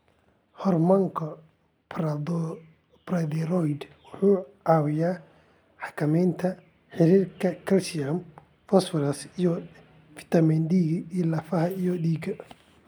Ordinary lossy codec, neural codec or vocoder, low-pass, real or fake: none; none; none; real